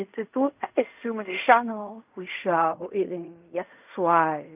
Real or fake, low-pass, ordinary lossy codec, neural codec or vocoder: fake; 3.6 kHz; none; codec, 16 kHz in and 24 kHz out, 0.4 kbps, LongCat-Audio-Codec, fine tuned four codebook decoder